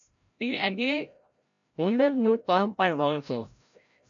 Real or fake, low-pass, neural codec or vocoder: fake; 7.2 kHz; codec, 16 kHz, 0.5 kbps, FreqCodec, larger model